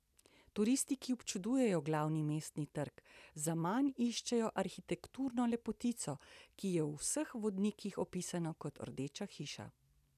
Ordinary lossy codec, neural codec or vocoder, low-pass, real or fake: none; none; 14.4 kHz; real